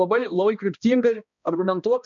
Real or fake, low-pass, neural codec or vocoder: fake; 7.2 kHz; codec, 16 kHz, 1 kbps, X-Codec, HuBERT features, trained on balanced general audio